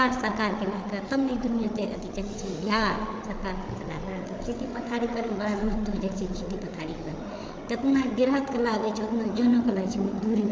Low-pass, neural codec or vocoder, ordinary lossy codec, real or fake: none; codec, 16 kHz, 8 kbps, FreqCodec, larger model; none; fake